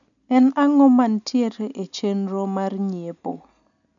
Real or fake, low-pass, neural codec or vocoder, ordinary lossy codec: real; 7.2 kHz; none; none